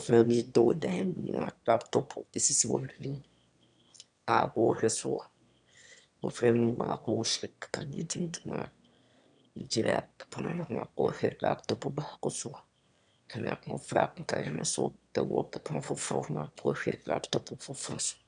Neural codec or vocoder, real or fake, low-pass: autoencoder, 22.05 kHz, a latent of 192 numbers a frame, VITS, trained on one speaker; fake; 9.9 kHz